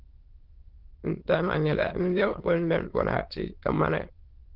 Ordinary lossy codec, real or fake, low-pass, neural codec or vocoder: Opus, 16 kbps; fake; 5.4 kHz; autoencoder, 22.05 kHz, a latent of 192 numbers a frame, VITS, trained on many speakers